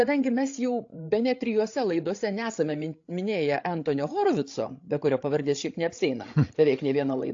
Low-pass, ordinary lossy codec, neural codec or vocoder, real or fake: 7.2 kHz; AAC, 48 kbps; codec, 16 kHz, 16 kbps, FreqCodec, larger model; fake